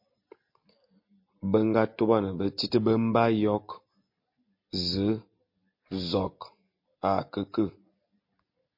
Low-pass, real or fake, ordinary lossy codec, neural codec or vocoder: 5.4 kHz; real; MP3, 32 kbps; none